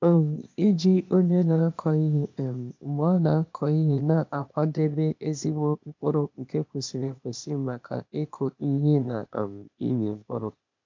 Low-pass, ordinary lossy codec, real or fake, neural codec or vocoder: 7.2 kHz; MP3, 64 kbps; fake; codec, 16 kHz, 0.8 kbps, ZipCodec